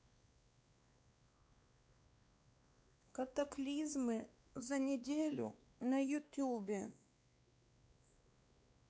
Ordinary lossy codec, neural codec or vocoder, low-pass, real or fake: none; codec, 16 kHz, 2 kbps, X-Codec, WavLM features, trained on Multilingual LibriSpeech; none; fake